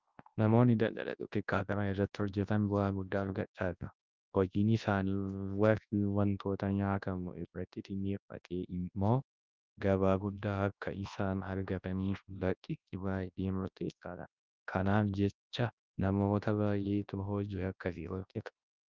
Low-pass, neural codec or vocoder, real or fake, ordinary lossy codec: 7.2 kHz; codec, 24 kHz, 0.9 kbps, WavTokenizer, large speech release; fake; Opus, 24 kbps